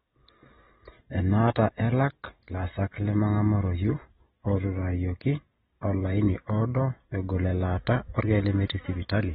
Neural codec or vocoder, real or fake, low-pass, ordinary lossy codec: none; real; 19.8 kHz; AAC, 16 kbps